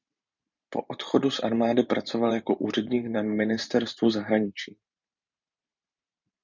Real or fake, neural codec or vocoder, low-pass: fake; vocoder, 44.1 kHz, 128 mel bands every 512 samples, BigVGAN v2; 7.2 kHz